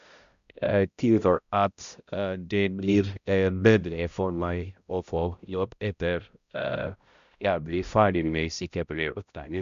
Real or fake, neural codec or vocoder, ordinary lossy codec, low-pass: fake; codec, 16 kHz, 0.5 kbps, X-Codec, HuBERT features, trained on balanced general audio; Opus, 64 kbps; 7.2 kHz